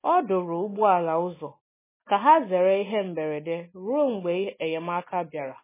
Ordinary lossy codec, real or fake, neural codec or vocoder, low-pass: MP3, 16 kbps; real; none; 3.6 kHz